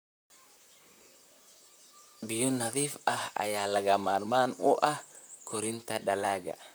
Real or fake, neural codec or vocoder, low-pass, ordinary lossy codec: fake; vocoder, 44.1 kHz, 128 mel bands, Pupu-Vocoder; none; none